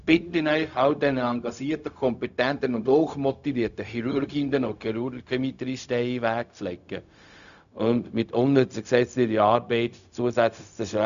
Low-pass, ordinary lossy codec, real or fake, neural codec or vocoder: 7.2 kHz; none; fake; codec, 16 kHz, 0.4 kbps, LongCat-Audio-Codec